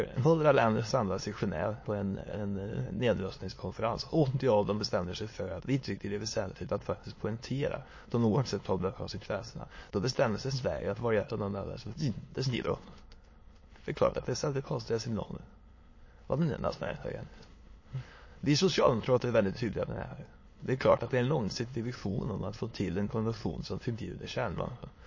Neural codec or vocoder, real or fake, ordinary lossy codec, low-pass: autoencoder, 22.05 kHz, a latent of 192 numbers a frame, VITS, trained on many speakers; fake; MP3, 32 kbps; 7.2 kHz